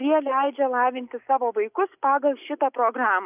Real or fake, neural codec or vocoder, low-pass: real; none; 3.6 kHz